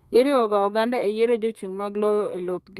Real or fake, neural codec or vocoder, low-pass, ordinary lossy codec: fake; codec, 32 kHz, 1.9 kbps, SNAC; 14.4 kHz; Opus, 64 kbps